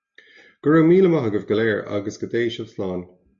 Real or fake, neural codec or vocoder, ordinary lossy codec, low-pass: real; none; MP3, 48 kbps; 7.2 kHz